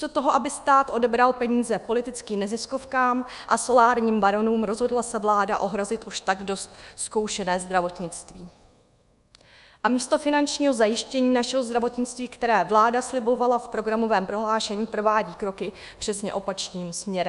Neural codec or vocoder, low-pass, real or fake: codec, 24 kHz, 1.2 kbps, DualCodec; 10.8 kHz; fake